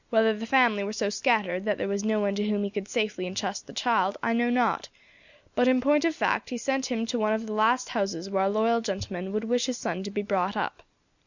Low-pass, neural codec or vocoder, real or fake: 7.2 kHz; none; real